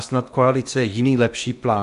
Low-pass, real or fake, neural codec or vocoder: 10.8 kHz; fake; codec, 16 kHz in and 24 kHz out, 0.8 kbps, FocalCodec, streaming, 65536 codes